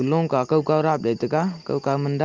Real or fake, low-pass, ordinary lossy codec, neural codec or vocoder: real; 7.2 kHz; Opus, 24 kbps; none